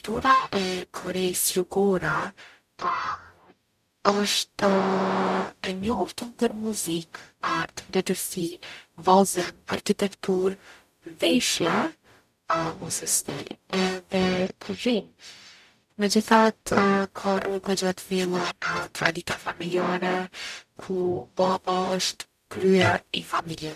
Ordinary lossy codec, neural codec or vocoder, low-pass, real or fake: none; codec, 44.1 kHz, 0.9 kbps, DAC; 14.4 kHz; fake